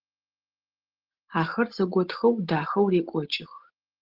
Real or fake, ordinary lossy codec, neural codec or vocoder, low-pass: real; Opus, 16 kbps; none; 5.4 kHz